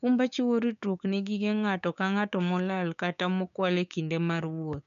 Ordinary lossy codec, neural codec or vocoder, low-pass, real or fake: none; codec, 16 kHz, 6 kbps, DAC; 7.2 kHz; fake